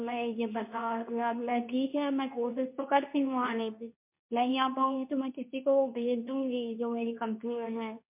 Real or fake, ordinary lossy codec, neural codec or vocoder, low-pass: fake; MP3, 32 kbps; codec, 24 kHz, 0.9 kbps, WavTokenizer, medium speech release version 2; 3.6 kHz